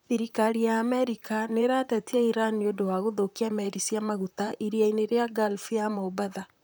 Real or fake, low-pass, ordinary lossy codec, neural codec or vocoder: fake; none; none; vocoder, 44.1 kHz, 128 mel bands, Pupu-Vocoder